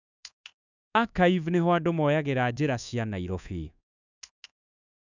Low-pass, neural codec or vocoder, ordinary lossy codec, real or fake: 7.2 kHz; codec, 24 kHz, 1.2 kbps, DualCodec; none; fake